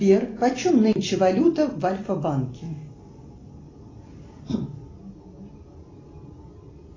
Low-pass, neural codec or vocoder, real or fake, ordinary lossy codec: 7.2 kHz; none; real; AAC, 32 kbps